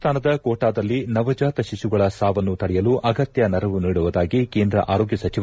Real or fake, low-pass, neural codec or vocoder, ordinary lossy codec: real; none; none; none